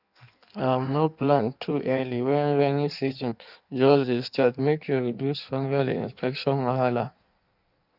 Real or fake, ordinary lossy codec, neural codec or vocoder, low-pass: fake; none; codec, 16 kHz in and 24 kHz out, 1.1 kbps, FireRedTTS-2 codec; 5.4 kHz